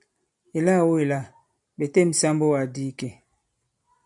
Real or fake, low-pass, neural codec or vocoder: real; 10.8 kHz; none